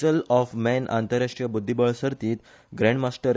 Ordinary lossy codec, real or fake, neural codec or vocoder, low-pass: none; real; none; none